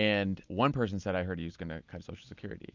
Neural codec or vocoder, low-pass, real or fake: none; 7.2 kHz; real